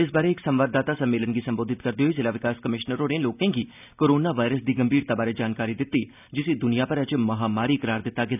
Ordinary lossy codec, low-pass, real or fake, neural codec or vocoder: none; 3.6 kHz; real; none